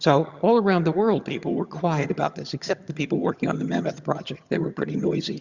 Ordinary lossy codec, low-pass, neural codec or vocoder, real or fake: Opus, 64 kbps; 7.2 kHz; vocoder, 22.05 kHz, 80 mel bands, HiFi-GAN; fake